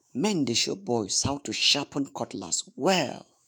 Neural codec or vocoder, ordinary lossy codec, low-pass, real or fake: autoencoder, 48 kHz, 128 numbers a frame, DAC-VAE, trained on Japanese speech; none; none; fake